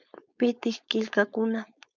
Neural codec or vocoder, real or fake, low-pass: codec, 16 kHz, 4.8 kbps, FACodec; fake; 7.2 kHz